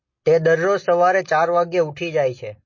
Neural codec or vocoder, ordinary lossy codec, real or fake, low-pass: none; MP3, 32 kbps; real; 7.2 kHz